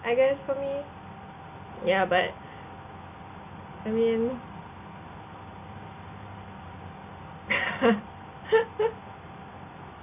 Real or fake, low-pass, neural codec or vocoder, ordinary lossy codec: real; 3.6 kHz; none; none